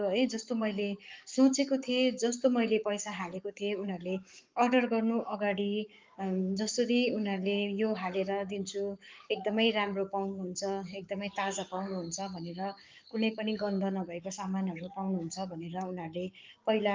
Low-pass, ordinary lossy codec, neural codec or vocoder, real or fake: 7.2 kHz; Opus, 32 kbps; codec, 44.1 kHz, 7.8 kbps, Pupu-Codec; fake